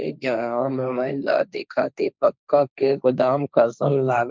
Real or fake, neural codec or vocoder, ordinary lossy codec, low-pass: fake; codec, 16 kHz, 1.1 kbps, Voila-Tokenizer; none; none